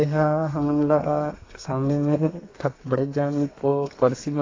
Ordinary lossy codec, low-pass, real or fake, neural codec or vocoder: AAC, 32 kbps; 7.2 kHz; fake; codec, 32 kHz, 1.9 kbps, SNAC